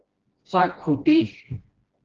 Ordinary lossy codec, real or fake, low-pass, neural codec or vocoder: Opus, 16 kbps; fake; 7.2 kHz; codec, 16 kHz, 1 kbps, FreqCodec, smaller model